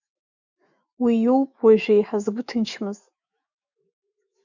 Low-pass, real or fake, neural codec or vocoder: 7.2 kHz; fake; autoencoder, 48 kHz, 128 numbers a frame, DAC-VAE, trained on Japanese speech